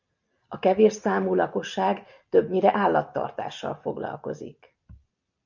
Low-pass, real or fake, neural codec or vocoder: 7.2 kHz; real; none